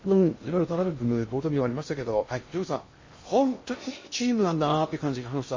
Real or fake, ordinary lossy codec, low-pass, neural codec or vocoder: fake; MP3, 32 kbps; 7.2 kHz; codec, 16 kHz in and 24 kHz out, 0.6 kbps, FocalCodec, streaming, 2048 codes